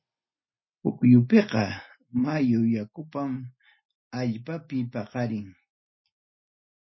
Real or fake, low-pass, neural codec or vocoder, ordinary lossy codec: real; 7.2 kHz; none; MP3, 24 kbps